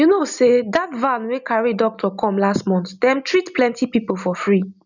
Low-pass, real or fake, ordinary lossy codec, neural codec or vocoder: 7.2 kHz; real; none; none